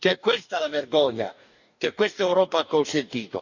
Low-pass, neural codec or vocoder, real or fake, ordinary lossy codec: 7.2 kHz; codec, 44.1 kHz, 2.6 kbps, DAC; fake; none